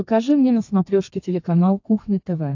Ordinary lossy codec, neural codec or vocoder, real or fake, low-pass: AAC, 48 kbps; codec, 32 kHz, 1.9 kbps, SNAC; fake; 7.2 kHz